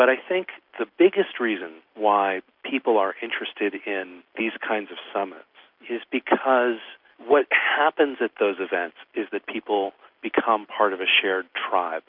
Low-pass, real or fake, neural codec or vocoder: 5.4 kHz; real; none